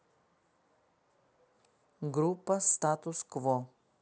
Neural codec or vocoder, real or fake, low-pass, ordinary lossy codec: none; real; none; none